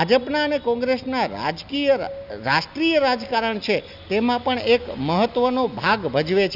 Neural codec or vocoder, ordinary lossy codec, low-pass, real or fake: none; none; 5.4 kHz; real